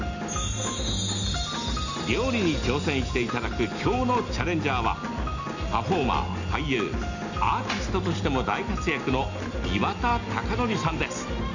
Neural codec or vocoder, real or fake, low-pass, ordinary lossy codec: none; real; 7.2 kHz; none